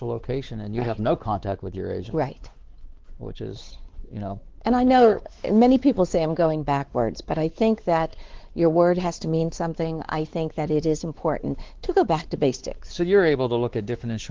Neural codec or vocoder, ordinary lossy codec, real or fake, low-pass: codec, 16 kHz, 4 kbps, X-Codec, WavLM features, trained on Multilingual LibriSpeech; Opus, 16 kbps; fake; 7.2 kHz